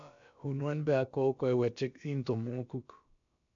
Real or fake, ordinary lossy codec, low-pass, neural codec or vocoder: fake; MP3, 64 kbps; 7.2 kHz; codec, 16 kHz, about 1 kbps, DyCAST, with the encoder's durations